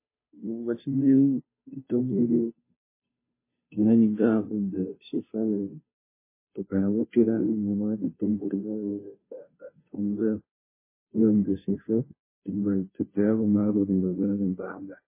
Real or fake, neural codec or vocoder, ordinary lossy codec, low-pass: fake; codec, 16 kHz, 0.5 kbps, FunCodec, trained on Chinese and English, 25 frames a second; MP3, 16 kbps; 3.6 kHz